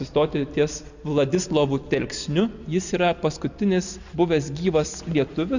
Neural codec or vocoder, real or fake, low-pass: none; real; 7.2 kHz